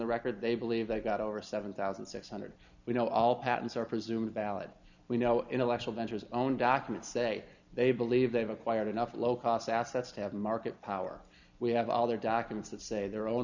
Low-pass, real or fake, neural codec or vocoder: 7.2 kHz; real; none